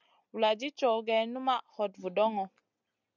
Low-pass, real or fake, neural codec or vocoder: 7.2 kHz; real; none